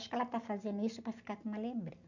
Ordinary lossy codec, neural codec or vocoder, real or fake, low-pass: none; none; real; 7.2 kHz